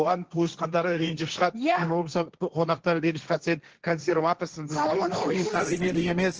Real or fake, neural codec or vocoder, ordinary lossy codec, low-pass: fake; codec, 16 kHz, 1.1 kbps, Voila-Tokenizer; Opus, 16 kbps; 7.2 kHz